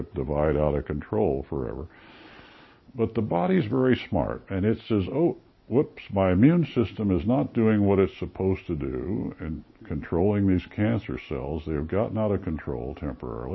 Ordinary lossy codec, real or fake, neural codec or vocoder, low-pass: MP3, 24 kbps; real; none; 7.2 kHz